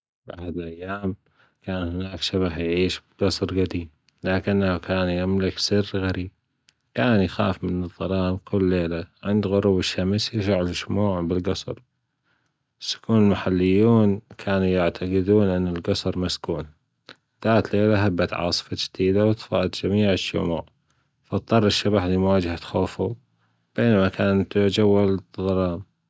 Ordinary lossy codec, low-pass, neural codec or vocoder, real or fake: none; none; none; real